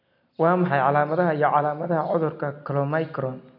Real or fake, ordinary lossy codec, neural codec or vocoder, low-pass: real; none; none; 5.4 kHz